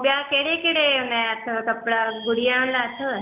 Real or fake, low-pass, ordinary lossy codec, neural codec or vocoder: real; 3.6 kHz; none; none